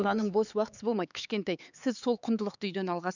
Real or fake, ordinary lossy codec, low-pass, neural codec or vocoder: fake; none; 7.2 kHz; codec, 16 kHz, 4 kbps, X-Codec, HuBERT features, trained on LibriSpeech